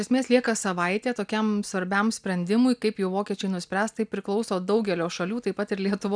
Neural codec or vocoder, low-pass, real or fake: none; 9.9 kHz; real